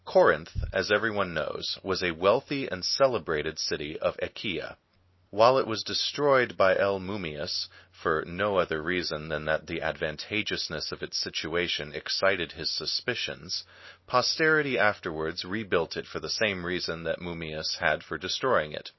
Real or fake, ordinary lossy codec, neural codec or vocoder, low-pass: real; MP3, 24 kbps; none; 7.2 kHz